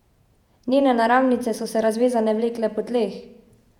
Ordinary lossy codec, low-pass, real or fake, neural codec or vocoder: none; 19.8 kHz; fake; vocoder, 48 kHz, 128 mel bands, Vocos